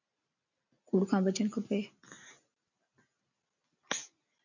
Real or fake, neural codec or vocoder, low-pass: real; none; 7.2 kHz